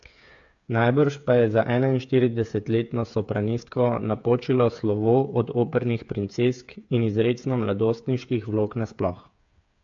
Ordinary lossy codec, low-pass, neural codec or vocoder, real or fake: AAC, 64 kbps; 7.2 kHz; codec, 16 kHz, 8 kbps, FreqCodec, smaller model; fake